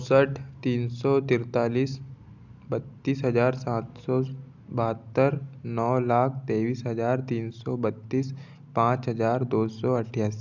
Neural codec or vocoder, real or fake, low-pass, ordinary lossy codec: none; real; 7.2 kHz; none